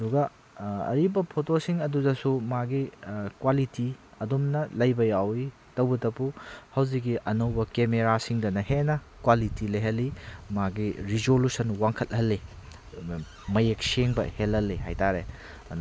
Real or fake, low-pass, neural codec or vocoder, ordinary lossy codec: real; none; none; none